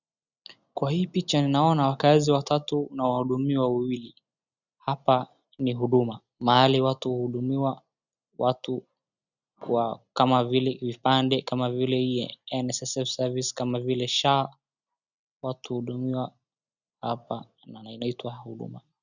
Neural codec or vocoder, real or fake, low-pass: none; real; 7.2 kHz